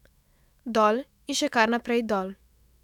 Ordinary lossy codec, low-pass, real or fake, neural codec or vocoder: none; 19.8 kHz; fake; autoencoder, 48 kHz, 128 numbers a frame, DAC-VAE, trained on Japanese speech